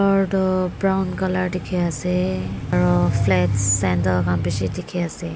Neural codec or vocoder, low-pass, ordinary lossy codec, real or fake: none; none; none; real